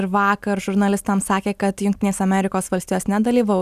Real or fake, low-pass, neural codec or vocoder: real; 14.4 kHz; none